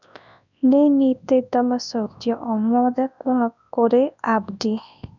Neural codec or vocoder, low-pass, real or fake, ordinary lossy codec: codec, 24 kHz, 0.9 kbps, WavTokenizer, large speech release; 7.2 kHz; fake; none